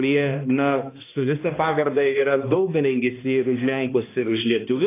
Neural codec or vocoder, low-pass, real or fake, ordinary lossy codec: codec, 16 kHz, 1 kbps, X-Codec, HuBERT features, trained on balanced general audio; 3.6 kHz; fake; AAC, 24 kbps